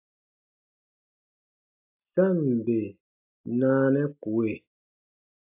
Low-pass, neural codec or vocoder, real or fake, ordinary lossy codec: 3.6 kHz; none; real; AAC, 32 kbps